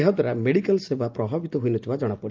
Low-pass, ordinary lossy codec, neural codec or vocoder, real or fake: 7.2 kHz; Opus, 24 kbps; none; real